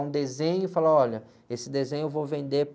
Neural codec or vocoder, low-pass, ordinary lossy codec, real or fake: none; none; none; real